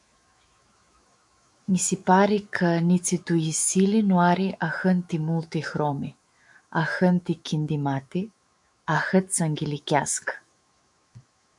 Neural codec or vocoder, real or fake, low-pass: autoencoder, 48 kHz, 128 numbers a frame, DAC-VAE, trained on Japanese speech; fake; 10.8 kHz